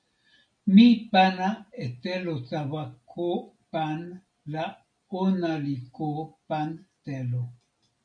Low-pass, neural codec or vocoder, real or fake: 9.9 kHz; none; real